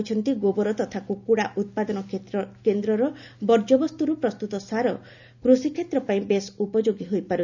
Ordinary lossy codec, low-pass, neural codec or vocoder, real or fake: none; 7.2 kHz; none; real